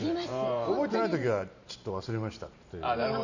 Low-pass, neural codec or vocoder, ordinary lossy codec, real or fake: 7.2 kHz; vocoder, 44.1 kHz, 128 mel bands every 512 samples, BigVGAN v2; none; fake